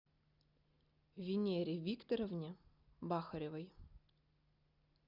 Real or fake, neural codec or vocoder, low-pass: real; none; 5.4 kHz